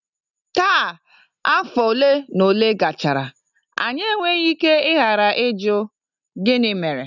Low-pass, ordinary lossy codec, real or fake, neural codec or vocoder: 7.2 kHz; none; real; none